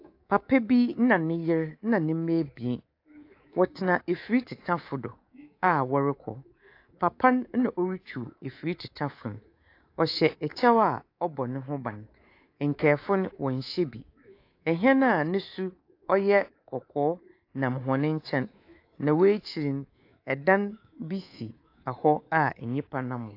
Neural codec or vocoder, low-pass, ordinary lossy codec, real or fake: codec, 24 kHz, 3.1 kbps, DualCodec; 5.4 kHz; AAC, 32 kbps; fake